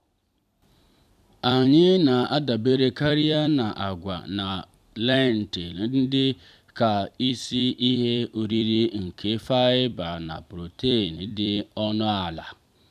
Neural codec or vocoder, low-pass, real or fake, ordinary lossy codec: vocoder, 44.1 kHz, 128 mel bands every 256 samples, BigVGAN v2; 14.4 kHz; fake; none